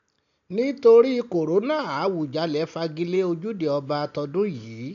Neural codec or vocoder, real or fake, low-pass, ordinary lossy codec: none; real; 7.2 kHz; none